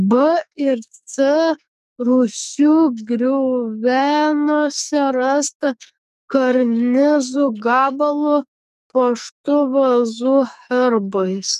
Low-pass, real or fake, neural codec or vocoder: 14.4 kHz; fake; codec, 44.1 kHz, 2.6 kbps, SNAC